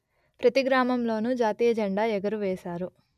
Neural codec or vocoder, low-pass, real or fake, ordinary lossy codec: none; 14.4 kHz; real; none